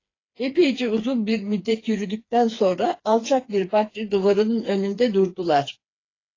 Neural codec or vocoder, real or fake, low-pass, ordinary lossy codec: codec, 16 kHz, 4 kbps, FreqCodec, smaller model; fake; 7.2 kHz; AAC, 32 kbps